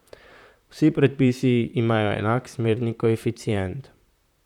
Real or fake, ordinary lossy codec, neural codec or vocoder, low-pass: fake; none; vocoder, 44.1 kHz, 128 mel bands, Pupu-Vocoder; 19.8 kHz